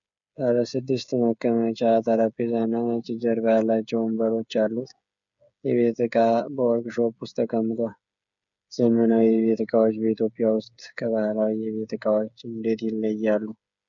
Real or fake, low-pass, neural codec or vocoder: fake; 7.2 kHz; codec, 16 kHz, 8 kbps, FreqCodec, smaller model